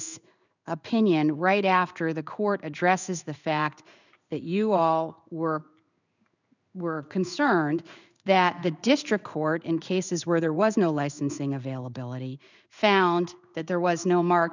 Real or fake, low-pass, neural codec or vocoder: fake; 7.2 kHz; codec, 16 kHz in and 24 kHz out, 1 kbps, XY-Tokenizer